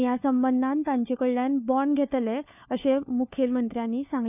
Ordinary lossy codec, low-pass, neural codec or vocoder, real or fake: none; 3.6 kHz; codec, 16 kHz, 4 kbps, FunCodec, trained on LibriTTS, 50 frames a second; fake